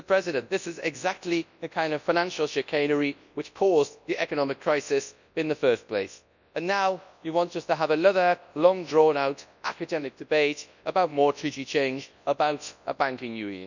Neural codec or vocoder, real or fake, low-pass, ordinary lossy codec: codec, 24 kHz, 0.9 kbps, WavTokenizer, large speech release; fake; 7.2 kHz; none